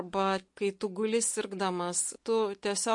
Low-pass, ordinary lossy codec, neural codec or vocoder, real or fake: 10.8 kHz; MP3, 48 kbps; none; real